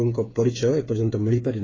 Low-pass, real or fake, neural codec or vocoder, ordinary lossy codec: 7.2 kHz; fake; codec, 16 kHz, 8 kbps, FreqCodec, smaller model; AAC, 32 kbps